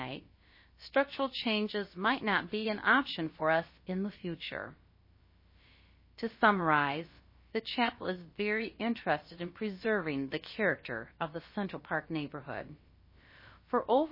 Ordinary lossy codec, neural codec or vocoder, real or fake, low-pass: MP3, 24 kbps; codec, 16 kHz, about 1 kbps, DyCAST, with the encoder's durations; fake; 5.4 kHz